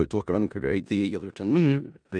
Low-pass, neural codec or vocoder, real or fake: 9.9 kHz; codec, 16 kHz in and 24 kHz out, 0.4 kbps, LongCat-Audio-Codec, four codebook decoder; fake